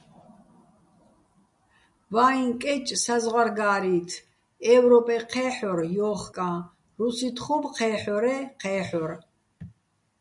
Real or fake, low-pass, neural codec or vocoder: real; 10.8 kHz; none